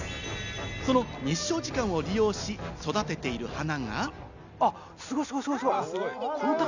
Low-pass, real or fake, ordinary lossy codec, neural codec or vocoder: 7.2 kHz; real; none; none